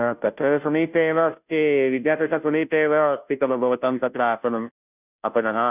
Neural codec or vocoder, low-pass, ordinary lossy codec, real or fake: codec, 16 kHz, 0.5 kbps, FunCodec, trained on Chinese and English, 25 frames a second; 3.6 kHz; none; fake